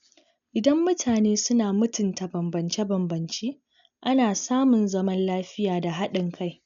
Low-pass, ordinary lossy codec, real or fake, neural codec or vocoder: 7.2 kHz; none; real; none